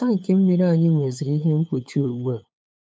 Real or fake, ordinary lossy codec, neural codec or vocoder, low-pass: fake; none; codec, 16 kHz, 8 kbps, FunCodec, trained on LibriTTS, 25 frames a second; none